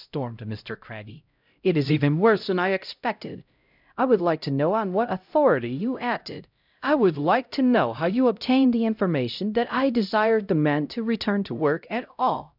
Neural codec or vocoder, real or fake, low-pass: codec, 16 kHz, 0.5 kbps, X-Codec, HuBERT features, trained on LibriSpeech; fake; 5.4 kHz